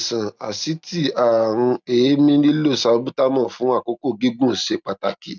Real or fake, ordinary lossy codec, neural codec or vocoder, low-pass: real; none; none; 7.2 kHz